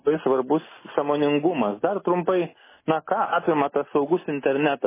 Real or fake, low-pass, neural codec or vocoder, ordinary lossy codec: real; 3.6 kHz; none; MP3, 16 kbps